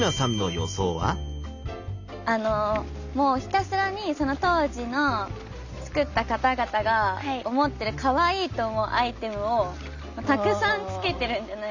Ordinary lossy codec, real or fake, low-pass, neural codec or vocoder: none; real; 7.2 kHz; none